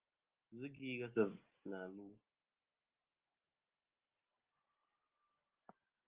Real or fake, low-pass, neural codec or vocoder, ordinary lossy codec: real; 3.6 kHz; none; Opus, 24 kbps